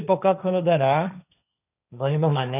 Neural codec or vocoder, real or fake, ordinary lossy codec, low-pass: codec, 16 kHz, 1.1 kbps, Voila-Tokenizer; fake; none; 3.6 kHz